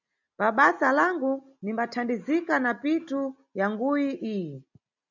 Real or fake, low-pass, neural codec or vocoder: real; 7.2 kHz; none